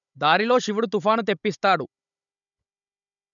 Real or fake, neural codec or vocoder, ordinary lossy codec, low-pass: fake; codec, 16 kHz, 16 kbps, FunCodec, trained on Chinese and English, 50 frames a second; none; 7.2 kHz